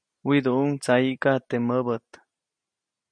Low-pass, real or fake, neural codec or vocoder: 9.9 kHz; real; none